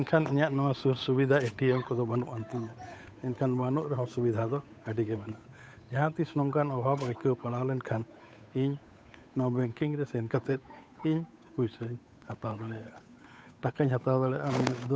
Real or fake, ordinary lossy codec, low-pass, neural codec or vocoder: fake; none; none; codec, 16 kHz, 8 kbps, FunCodec, trained on Chinese and English, 25 frames a second